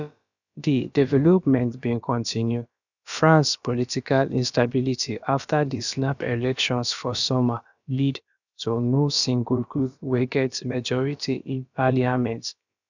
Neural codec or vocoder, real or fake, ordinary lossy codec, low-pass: codec, 16 kHz, about 1 kbps, DyCAST, with the encoder's durations; fake; none; 7.2 kHz